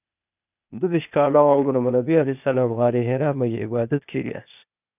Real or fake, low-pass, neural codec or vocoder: fake; 3.6 kHz; codec, 16 kHz, 0.8 kbps, ZipCodec